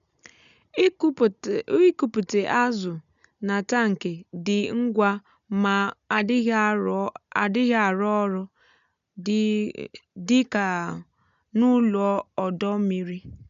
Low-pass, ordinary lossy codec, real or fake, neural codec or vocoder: 7.2 kHz; none; real; none